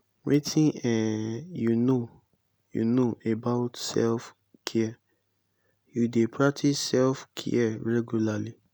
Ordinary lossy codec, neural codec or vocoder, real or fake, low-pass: none; none; real; none